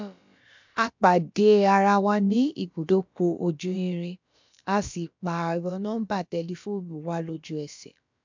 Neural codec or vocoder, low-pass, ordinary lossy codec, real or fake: codec, 16 kHz, about 1 kbps, DyCAST, with the encoder's durations; 7.2 kHz; MP3, 64 kbps; fake